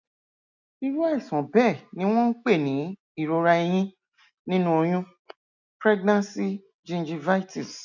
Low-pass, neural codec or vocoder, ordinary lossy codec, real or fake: 7.2 kHz; none; none; real